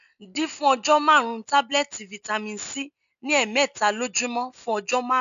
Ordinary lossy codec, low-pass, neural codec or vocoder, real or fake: none; 7.2 kHz; none; real